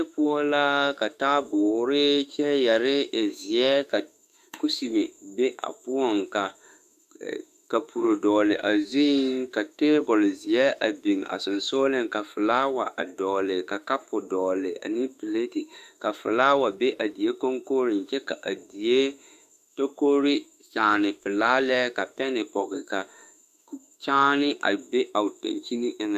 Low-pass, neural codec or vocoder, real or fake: 14.4 kHz; autoencoder, 48 kHz, 32 numbers a frame, DAC-VAE, trained on Japanese speech; fake